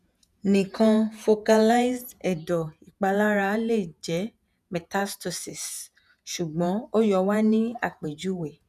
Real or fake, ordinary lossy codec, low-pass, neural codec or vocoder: fake; none; 14.4 kHz; vocoder, 48 kHz, 128 mel bands, Vocos